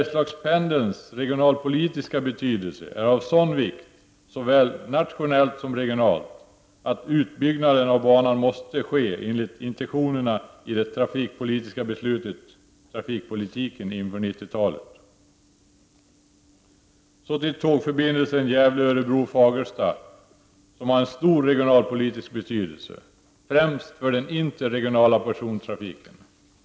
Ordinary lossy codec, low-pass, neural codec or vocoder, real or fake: none; none; none; real